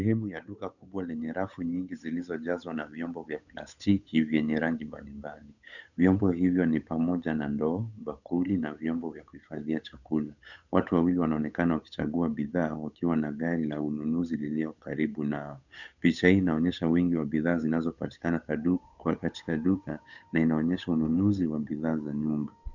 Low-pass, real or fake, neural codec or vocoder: 7.2 kHz; fake; codec, 16 kHz, 8 kbps, FunCodec, trained on Chinese and English, 25 frames a second